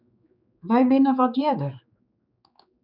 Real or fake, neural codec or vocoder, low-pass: fake; codec, 16 kHz, 4 kbps, X-Codec, HuBERT features, trained on general audio; 5.4 kHz